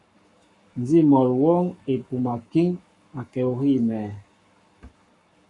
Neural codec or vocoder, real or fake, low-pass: codec, 44.1 kHz, 7.8 kbps, Pupu-Codec; fake; 10.8 kHz